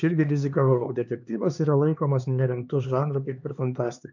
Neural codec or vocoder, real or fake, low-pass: codec, 16 kHz, 4 kbps, X-Codec, HuBERT features, trained on LibriSpeech; fake; 7.2 kHz